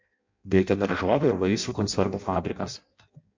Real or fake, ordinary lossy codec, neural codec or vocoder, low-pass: fake; MP3, 48 kbps; codec, 16 kHz in and 24 kHz out, 0.6 kbps, FireRedTTS-2 codec; 7.2 kHz